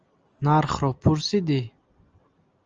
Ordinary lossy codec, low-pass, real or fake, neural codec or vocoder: Opus, 24 kbps; 7.2 kHz; real; none